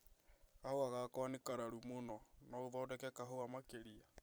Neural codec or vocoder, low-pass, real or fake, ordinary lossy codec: vocoder, 44.1 kHz, 128 mel bands every 256 samples, BigVGAN v2; none; fake; none